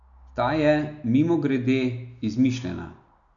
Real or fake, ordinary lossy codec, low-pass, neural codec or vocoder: real; none; 7.2 kHz; none